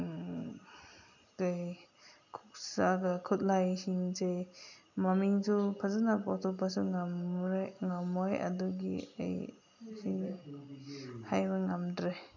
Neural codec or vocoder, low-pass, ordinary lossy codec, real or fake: none; 7.2 kHz; none; real